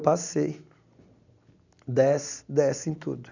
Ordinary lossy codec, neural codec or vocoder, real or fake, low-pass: none; none; real; 7.2 kHz